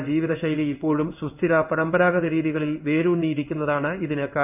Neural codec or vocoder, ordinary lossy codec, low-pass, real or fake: codec, 16 kHz in and 24 kHz out, 1 kbps, XY-Tokenizer; none; 3.6 kHz; fake